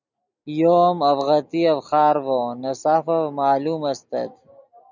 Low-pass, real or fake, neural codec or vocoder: 7.2 kHz; real; none